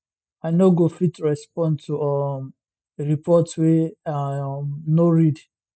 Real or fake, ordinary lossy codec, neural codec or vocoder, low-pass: real; none; none; none